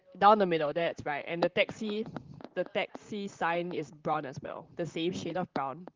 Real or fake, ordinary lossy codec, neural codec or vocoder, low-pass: fake; Opus, 32 kbps; vocoder, 44.1 kHz, 128 mel bands, Pupu-Vocoder; 7.2 kHz